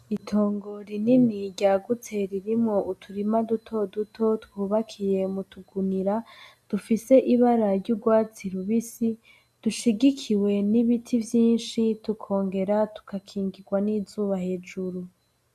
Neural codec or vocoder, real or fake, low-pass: none; real; 14.4 kHz